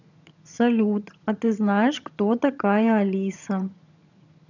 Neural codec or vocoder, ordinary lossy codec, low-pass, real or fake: vocoder, 22.05 kHz, 80 mel bands, HiFi-GAN; none; 7.2 kHz; fake